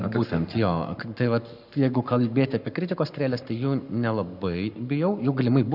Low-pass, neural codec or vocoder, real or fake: 5.4 kHz; codec, 44.1 kHz, 7.8 kbps, Pupu-Codec; fake